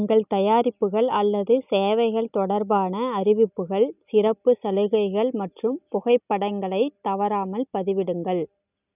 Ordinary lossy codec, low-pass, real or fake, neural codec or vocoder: none; 3.6 kHz; real; none